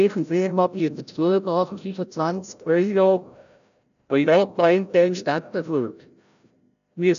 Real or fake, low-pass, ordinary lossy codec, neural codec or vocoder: fake; 7.2 kHz; MP3, 96 kbps; codec, 16 kHz, 0.5 kbps, FreqCodec, larger model